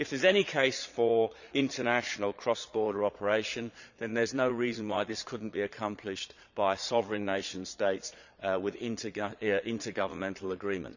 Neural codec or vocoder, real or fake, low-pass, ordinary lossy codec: vocoder, 22.05 kHz, 80 mel bands, Vocos; fake; 7.2 kHz; none